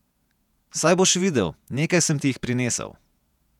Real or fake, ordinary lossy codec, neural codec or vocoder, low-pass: fake; none; vocoder, 48 kHz, 128 mel bands, Vocos; 19.8 kHz